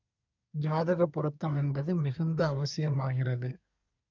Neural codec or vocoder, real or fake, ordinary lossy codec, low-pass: codec, 24 kHz, 1 kbps, SNAC; fake; none; 7.2 kHz